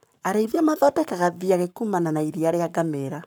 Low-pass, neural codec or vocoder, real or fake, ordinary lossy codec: none; codec, 44.1 kHz, 7.8 kbps, Pupu-Codec; fake; none